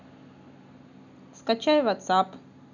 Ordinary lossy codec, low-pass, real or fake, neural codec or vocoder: none; 7.2 kHz; real; none